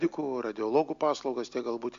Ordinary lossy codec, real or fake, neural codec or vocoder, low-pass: AAC, 64 kbps; real; none; 7.2 kHz